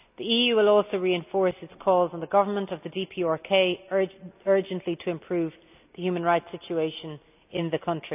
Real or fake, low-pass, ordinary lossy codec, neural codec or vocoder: real; 3.6 kHz; none; none